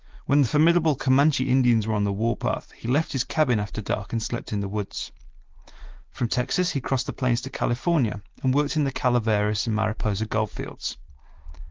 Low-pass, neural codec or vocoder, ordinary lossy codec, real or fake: 7.2 kHz; none; Opus, 16 kbps; real